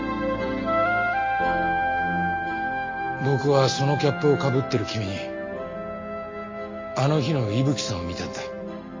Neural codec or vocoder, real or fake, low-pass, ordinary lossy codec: none; real; 7.2 kHz; MP3, 32 kbps